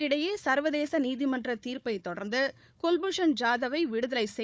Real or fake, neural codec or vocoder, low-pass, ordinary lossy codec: fake; codec, 16 kHz, 4 kbps, FunCodec, trained on Chinese and English, 50 frames a second; none; none